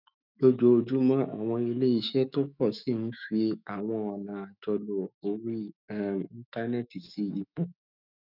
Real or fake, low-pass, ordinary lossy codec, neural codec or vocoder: fake; 5.4 kHz; none; autoencoder, 48 kHz, 128 numbers a frame, DAC-VAE, trained on Japanese speech